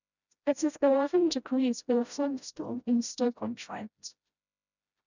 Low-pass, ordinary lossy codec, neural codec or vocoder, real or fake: 7.2 kHz; none; codec, 16 kHz, 0.5 kbps, FreqCodec, smaller model; fake